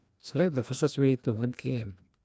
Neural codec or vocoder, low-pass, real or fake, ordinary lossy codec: codec, 16 kHz, 1 kbps, FreqCodec, larger model; none; fake; none